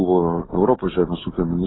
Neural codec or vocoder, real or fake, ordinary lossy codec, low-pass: none; real; AAC, 16 kbps; 7.2 kHz